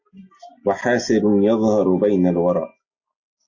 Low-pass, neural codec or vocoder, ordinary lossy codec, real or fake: 7.2 kHz; none; AAC, 48 kbps; real